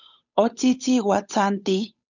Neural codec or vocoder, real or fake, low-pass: codec, 16 kHz, 8 kbps, FunCodec, trained on Chinese and English, 25 frames a second; fake; 7.2 kHz